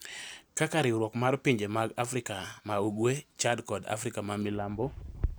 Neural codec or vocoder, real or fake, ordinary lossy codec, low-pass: none; real; none; none